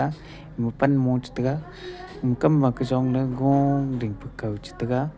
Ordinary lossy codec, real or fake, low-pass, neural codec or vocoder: none; real; none; none